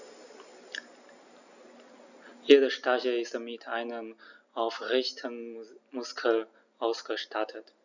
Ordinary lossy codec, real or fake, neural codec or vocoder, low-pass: none; real; none; 7.2 kHz